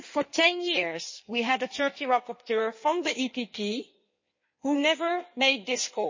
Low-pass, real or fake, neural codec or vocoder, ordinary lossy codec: 7.2 kHz; fake; codec, 16 kHz in and 24 kHz out, 1.1 kbps, FireRedTTS-2 codec; MP3, 32 kbps